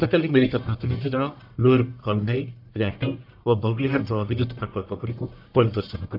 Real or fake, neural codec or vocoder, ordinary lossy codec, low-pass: fake; codec, 44.1 kHz, 1.7 kbps, Pupu-Codec; AAC, 48 kbps; 5.4 kHz